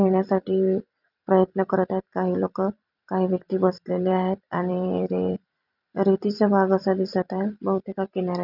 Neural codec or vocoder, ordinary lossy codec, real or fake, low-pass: vocoder, 44.1 kHz, 128 mel bands every 256 samples, BigVGAN v2; none; fake; 5.4 kHz